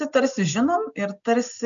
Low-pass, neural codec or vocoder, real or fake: 7.2 kHz; none; real